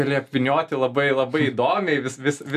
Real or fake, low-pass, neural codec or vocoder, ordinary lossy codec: real; 14.4 kHz; none; MP3, 96 kbps